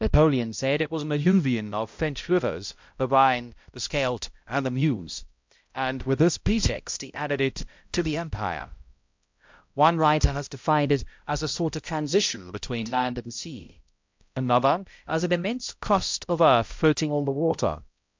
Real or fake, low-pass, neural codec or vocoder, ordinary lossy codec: fake; 7.2 kHz; codec, 16 kHz, 0.5 kbps, X-Codec, HuBERT features, trained on balanced general audio; MP3, 64 kbps